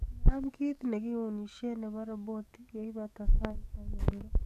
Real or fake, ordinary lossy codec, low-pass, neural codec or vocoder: fake; none; 14.4 kHz; codec, 44.1 kHz, 7.8 kbps, DAC